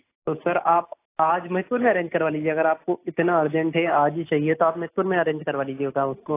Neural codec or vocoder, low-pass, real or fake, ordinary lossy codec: none; 3.6 kHz; real; AAC, 24 kbps